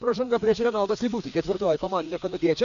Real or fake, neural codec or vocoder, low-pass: fake; codec, 16 kHz, 2 kbps, FreqCodec, larger model; 7.2 kHz